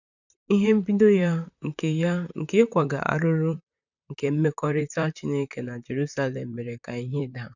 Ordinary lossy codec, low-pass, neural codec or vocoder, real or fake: none; 7.2 kHz; vocoder, 44.1 kHz, 128 mel bands, Pupu-Vocoder; fake